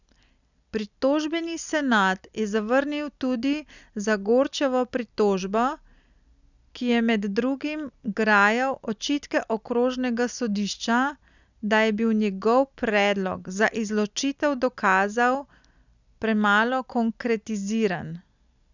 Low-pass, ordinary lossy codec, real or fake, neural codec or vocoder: 7.2 kHz; none; real; none